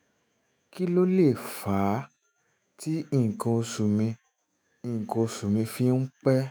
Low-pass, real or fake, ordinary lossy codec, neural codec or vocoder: none; fake; none; autoencoder, 48 kHz, 128 numbers a frame, DAC-VAE, trained on Japanese speech